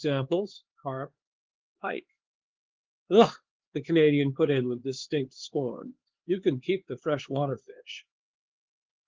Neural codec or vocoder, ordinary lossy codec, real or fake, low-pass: codec, 16 kHz, 4 kbps, X-Codec, HuBERT features, trained on LibriSpeech; Opus, 16 kbps; fake; 7.2 kHz